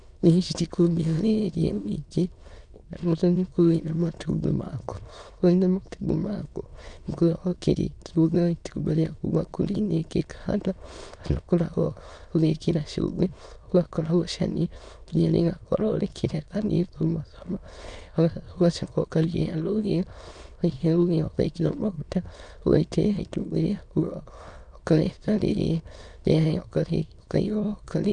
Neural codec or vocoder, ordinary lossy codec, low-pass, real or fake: autoencoder, 22.05 kHz, a latent of 192 numbers a frame, VITS, trained on many speakers; none; 9.9 kHz; fake